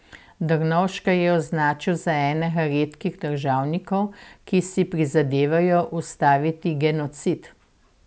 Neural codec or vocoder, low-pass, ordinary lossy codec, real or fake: none; none; none; real